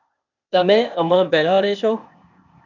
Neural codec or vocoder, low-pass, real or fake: codec, 16 kHz, 0.8 kbps, ZipCodec; 7.2 kHz; fake